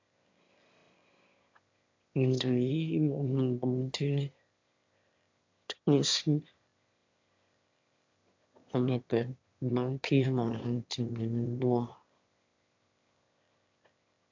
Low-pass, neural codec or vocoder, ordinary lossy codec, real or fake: 7.2 kHz; autoencoder, 22.05 kHz, a latent of 192 numbers a frame, VITS, trained on one speaker; MP3, 64 kbps; fake